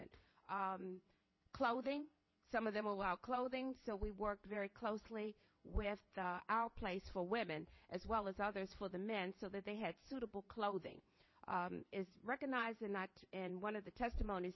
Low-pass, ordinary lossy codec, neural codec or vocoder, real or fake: 7.2 kHz; MP3, 24 kbps; vocoder, 22.05 kHz, 80 mel bands, WaveNeXt; fake